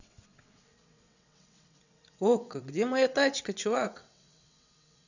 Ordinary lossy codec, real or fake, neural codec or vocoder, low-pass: none; fake; vocoder, 22.05 kHz, 80 mel bands, WaveNeXt; 7.2 kHz